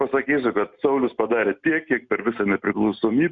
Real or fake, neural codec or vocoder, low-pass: real; none; 7.2 kHz